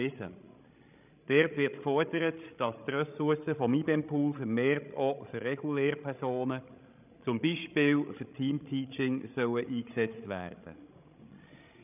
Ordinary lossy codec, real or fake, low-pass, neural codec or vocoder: none; fake; 3.6 kHz; codec, 16 kHz, 16 kbps, FreqCodec, larger model